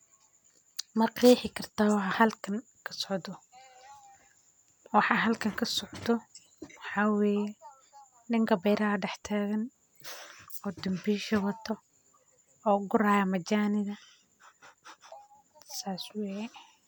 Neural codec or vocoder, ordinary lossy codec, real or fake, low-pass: none; none; real; none